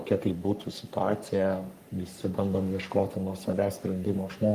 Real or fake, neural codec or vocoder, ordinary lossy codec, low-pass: fake; codec, 44.1 kHz, 3.4 kbps, Pupu-Codec; Opus, 32 kbps; 14.4 kHz